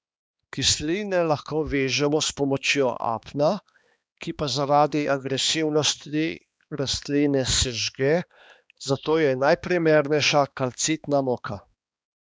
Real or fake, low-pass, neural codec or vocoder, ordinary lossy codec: fake; none; codec, 16 kHz, 2 kbps, X-Codec, HuBERT features, trained on balanced general audio; none